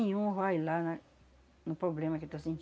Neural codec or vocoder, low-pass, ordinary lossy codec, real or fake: none; none; none; real